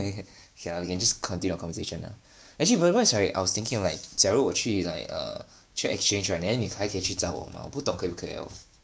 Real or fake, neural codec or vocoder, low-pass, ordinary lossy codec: fake; codec, 16 kHz, 6 kbps, DAC; none; none